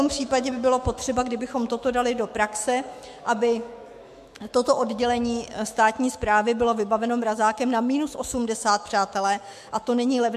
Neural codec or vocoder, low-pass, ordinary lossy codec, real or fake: autoencoder, 48 kHz, 128 numbers a frame, DAC-VAE, trained on Japanese speech; 14.4 kHz; MP3, 64 kbps; fake